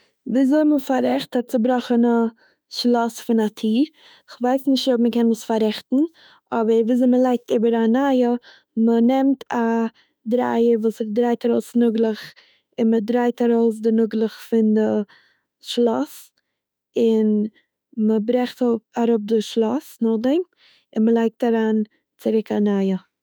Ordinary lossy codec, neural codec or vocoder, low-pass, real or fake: none; autoencoder, 48 kHz, 32 numbers a frame, DAC-VAE, trained on Japanese speech; none; fake